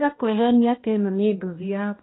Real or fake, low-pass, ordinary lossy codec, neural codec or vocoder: fake; 7.2 kHz; AAC, 16 kbps; codec, 16 kHz, 1 kbps, X-Codec, HuBERT features, trained on balanced general audio